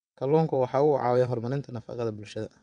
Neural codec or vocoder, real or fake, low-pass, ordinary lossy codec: vocoder, 22.05 kHz, 80 mel bands, WaveNeXt; fake; 9.9 kHz; none